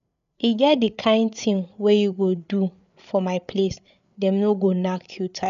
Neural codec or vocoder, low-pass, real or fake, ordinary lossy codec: codec, 16 kHz, 8 kbps, FreqCodec, larger model; 7.2 kHz; fake; none